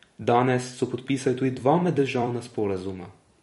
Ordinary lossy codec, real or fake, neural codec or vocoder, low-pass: MP3, 48 kbps; fake; vocoder, 44.1 kHz, 128 mel bands every 256 samples, BigVGAN v2; 19.8 kHz